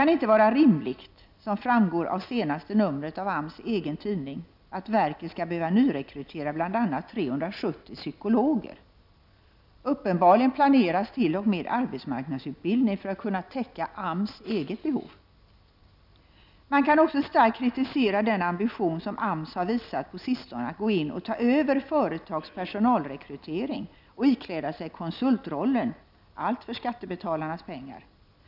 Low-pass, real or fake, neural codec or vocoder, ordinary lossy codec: 5.4 kHz; real; none; none